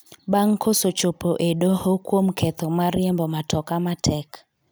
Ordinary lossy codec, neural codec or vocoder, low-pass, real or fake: none; none; none; real